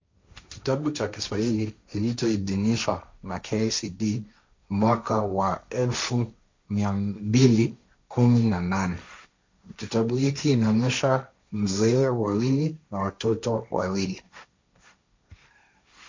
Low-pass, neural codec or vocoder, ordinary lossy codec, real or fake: 7.2 kHz; codec, 16 kHz, 1.1 kbps, Voila-Tokenizer; MP3, 64 kbps; fake